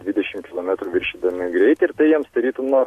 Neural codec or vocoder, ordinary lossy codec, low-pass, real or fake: none; AAC, 64 kbps; 14.4 kHz; real